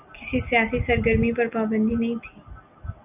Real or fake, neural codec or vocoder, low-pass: real; none; 3.6 kHz